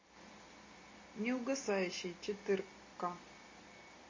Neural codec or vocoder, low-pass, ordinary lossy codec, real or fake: none; 7.2 kHz; MP3, 32 kbps; real